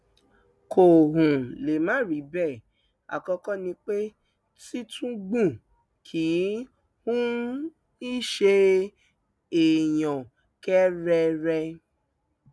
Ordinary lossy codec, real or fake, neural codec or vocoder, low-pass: none; real; none; none